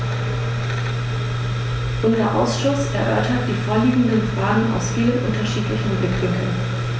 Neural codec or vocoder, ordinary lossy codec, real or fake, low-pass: none; none; real; none